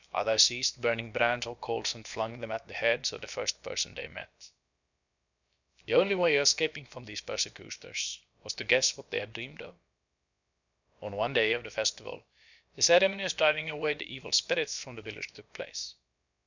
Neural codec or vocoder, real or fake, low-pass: codec, 16 kHz, about 1 kbps, DyCAST, with the encoder's durations; fake; 7.2 kHz